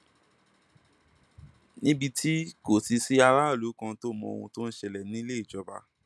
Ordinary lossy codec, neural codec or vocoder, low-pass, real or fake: none; none; none; real